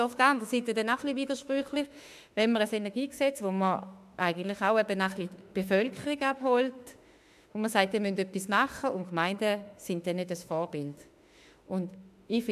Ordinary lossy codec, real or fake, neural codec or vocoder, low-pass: AAC, 96 kbps; fake; autoencoder, 48 kHz, 32 numbers a frame, DAC-VAE, trained on Japanese speech; 14.4 kHz